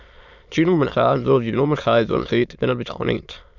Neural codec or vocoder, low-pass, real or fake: autoencoder, 22.05 kHz, a latent of 192 numbers a frame, VITS, trained on many speakers; 7.2 kHz; fake